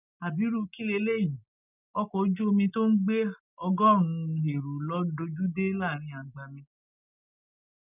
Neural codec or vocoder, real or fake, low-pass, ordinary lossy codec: none; real; 3.6 kHz; none